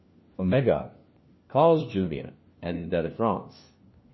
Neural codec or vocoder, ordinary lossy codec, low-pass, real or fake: codec, 16 kHz, 1 kbps, FunCodec, trained on LibriTTS, 50 frames a second; MP3, 24 kbps; 7.2 kHz; fake